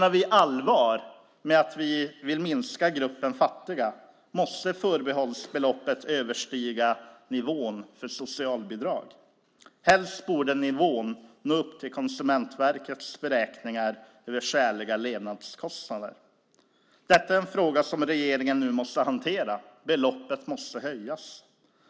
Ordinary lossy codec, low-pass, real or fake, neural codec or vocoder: none; none; real; none